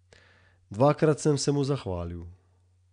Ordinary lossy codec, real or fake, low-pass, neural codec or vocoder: none; real; 9.9 kHz; none